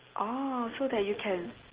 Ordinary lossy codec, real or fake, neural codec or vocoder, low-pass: Opus, 16 kbps; real; none; 3.6 kHz